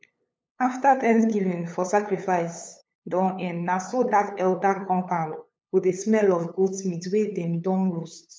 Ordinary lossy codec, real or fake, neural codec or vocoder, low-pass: none; fake; codec, 16 kHz, 8 kbps, FunCodec, trained on LibriTTS, 25 frames a second; none